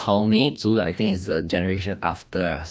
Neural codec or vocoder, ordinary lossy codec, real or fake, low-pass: codec, 16 kHz, 1 kbps, FreqCodec, larger model; none; fake; none